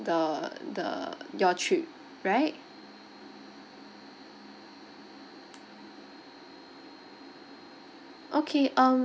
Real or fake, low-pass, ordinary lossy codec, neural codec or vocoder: real; none; none; none